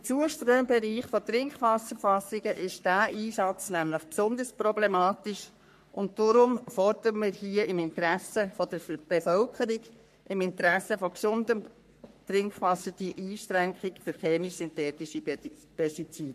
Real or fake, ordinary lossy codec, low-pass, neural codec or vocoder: fake; MP3, 64 kbps; 14.4 kHz; codec, 44.1 kHz, 3.4 kbps, Pupu-Codec